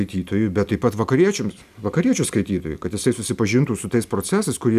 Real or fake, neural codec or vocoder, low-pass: real; none; 14.4 kHz